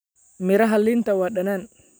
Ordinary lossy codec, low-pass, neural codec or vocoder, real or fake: none; none; none; real